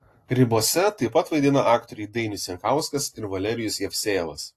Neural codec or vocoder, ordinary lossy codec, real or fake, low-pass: none; AAC, 48 kbps; real; 14.4 kHz